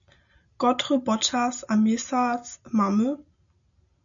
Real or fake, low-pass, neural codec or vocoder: real; 7.2 kHz; none